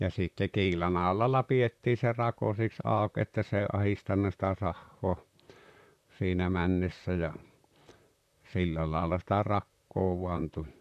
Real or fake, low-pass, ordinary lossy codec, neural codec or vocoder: fake; 14.4 kHz; none; vocoder, 44.1 kHz, 128 mel bands, Pupu-Vocoder